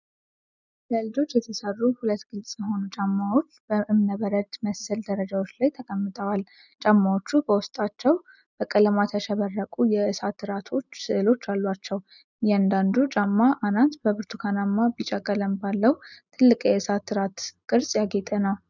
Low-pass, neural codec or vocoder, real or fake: 7.2 kHz; none; real